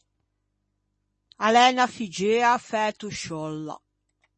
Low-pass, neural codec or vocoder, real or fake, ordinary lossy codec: 10.8 kHz; none; real; MP3, 32 kbps